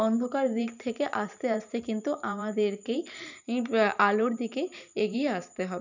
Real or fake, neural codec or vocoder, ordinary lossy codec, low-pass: fake; vocoder, 44.1 kHz, 128 mel bands every 512 samples, BigVGAN v2; none; 7.2 kHz